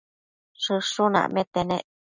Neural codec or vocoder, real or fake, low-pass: none; real; 7.2 kHz